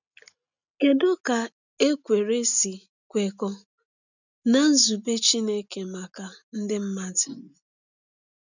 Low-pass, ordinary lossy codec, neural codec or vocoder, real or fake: 7.2 kHz; none; none; real